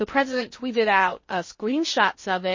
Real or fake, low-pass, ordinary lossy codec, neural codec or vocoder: fake; 7.2 kHz; MP3, 32 kbps; codec, 16 kHz in and 24 kHz out, 0.6 kbps, FocalCodec, streaming, 2048 codes